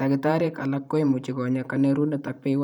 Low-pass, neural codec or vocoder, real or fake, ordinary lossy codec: 19.8 kHz; vocoder, 44.1 kHz, 128 mel bands every 512 samples, BigVGAN v2; fake; none